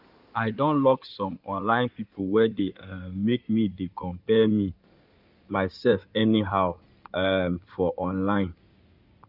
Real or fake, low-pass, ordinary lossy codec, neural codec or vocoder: fake; 5.4 kHz; none; codec, 16 kHz in and 24 kHz out, 2.2 kbps, FireRedTTS-2 codec